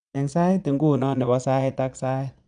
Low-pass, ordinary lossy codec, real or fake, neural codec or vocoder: 9.9 kHz; none; fake; vocoder, 22.05 kHz, 80 mel bands, Vocos